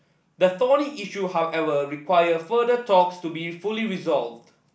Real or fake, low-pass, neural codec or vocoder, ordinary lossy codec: real; none; none; none